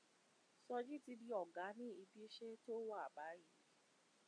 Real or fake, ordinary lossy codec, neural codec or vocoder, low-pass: real; AAC, 48 kbps; none; 9.9 kHz